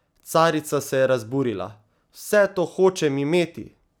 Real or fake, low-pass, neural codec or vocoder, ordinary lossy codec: real; none; none; none